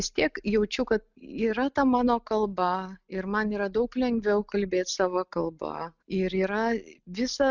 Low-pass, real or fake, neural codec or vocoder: 7.2 kHz; real; none